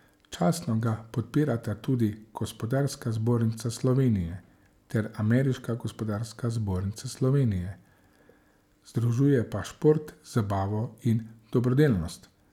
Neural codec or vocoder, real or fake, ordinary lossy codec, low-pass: none; real; none; 19.8 kHz